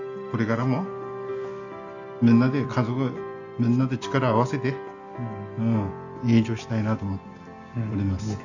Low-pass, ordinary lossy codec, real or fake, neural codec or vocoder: 7.2 kHz; none; real; none